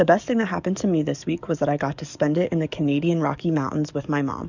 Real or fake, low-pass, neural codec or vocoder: fake; 7.2 kHz; codec, 44.1 kHz, 7.8 kbps, DAC